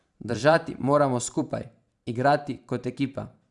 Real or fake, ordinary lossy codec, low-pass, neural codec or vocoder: fake; Opus, 64 kbps; 10.8 kHz; vocoder, 44.1 kHz, 128 mel bands every 512 samples, BigVGAN v2